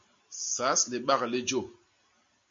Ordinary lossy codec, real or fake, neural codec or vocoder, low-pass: MP3, 48 kbps; real; none; 7.2 kHz